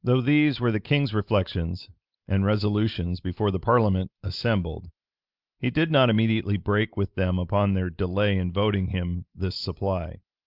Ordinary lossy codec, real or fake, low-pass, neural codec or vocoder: Opus, 32 kbps; real; 5.4 kHz; none